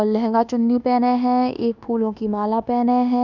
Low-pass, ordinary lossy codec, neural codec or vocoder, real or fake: 7.2 kHz; none; codec, 24 kHz, 1.2 kbps, DualCodec; fake